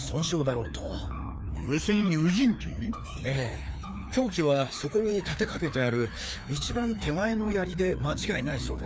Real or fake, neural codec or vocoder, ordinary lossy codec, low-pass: fake; codec, 16 kHz, 2 kbps, FreqCodec, larger model; none; none